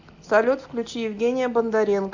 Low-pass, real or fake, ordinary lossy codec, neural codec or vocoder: 7.2 kHz; real; AAC, 48 kbps; none